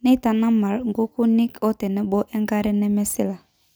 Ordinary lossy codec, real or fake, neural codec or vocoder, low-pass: none; real; none; none